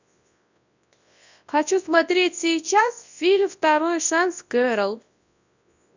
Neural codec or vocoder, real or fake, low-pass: codec, 24 kHz, 0.9 kbps, WavTokenizer, large speech release; fake; 7.2 kHz